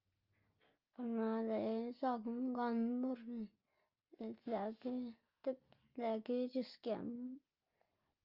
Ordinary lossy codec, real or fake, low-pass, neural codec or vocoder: Opus, 24 kbps; real; 5.4 kHz; none